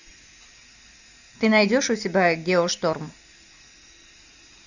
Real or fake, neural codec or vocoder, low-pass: real; none; 7.2 kHz